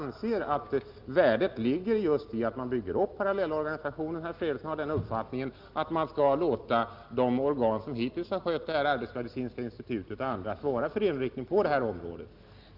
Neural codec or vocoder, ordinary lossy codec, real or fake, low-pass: none; Opus, 24 kbps; real; 5.4 kHz